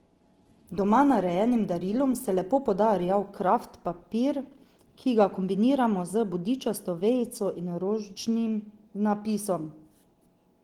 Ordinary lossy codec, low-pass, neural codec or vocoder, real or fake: Opus, 16 kbps; 19.8 kHz; none; real